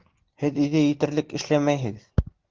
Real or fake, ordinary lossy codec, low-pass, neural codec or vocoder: real; Opus, 16 kbps; 7.2 kHz; none